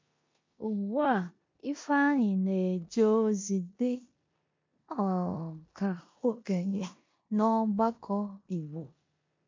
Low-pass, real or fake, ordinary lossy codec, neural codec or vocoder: 7.2 kHz; fake; MP3, 48 kbps; codec, 16 kHz in and 24 kHz out, 0.9 kbps, LongCat-Audio-Codec, four codebook decoder